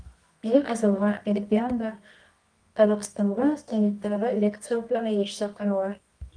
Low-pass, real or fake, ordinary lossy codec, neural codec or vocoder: 9.9 kHz; fake; Opus, 32 kbps; codec, 24 kHz, 0.9 kbps, WavTokenizer, medium music audio release